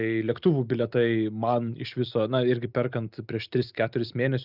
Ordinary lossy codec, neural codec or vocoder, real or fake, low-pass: Opus, 64 kbps; none; real; 5.4 kHz